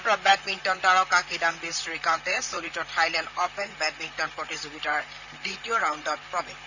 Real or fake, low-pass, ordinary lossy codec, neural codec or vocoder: fake; 7.2 kHz; none; vocoder, 44.1 kHz, 128 mel bands, Pupu-Vocoder